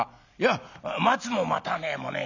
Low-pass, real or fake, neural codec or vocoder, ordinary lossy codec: 7.2 kHz; real; none; none